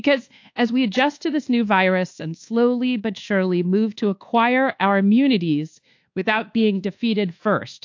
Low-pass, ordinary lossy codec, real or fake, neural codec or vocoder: 7.2 kHz; AAC, 48 kbps; fake; codec, 24 kHz, 1.2 kbps, DualCodec